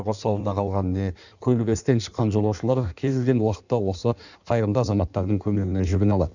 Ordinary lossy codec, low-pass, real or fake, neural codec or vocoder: none; 7.2 kHz; fake; codec, 16 kHz in and 24 kHz out, 1.1 kbps, FireRedTTS-2 codec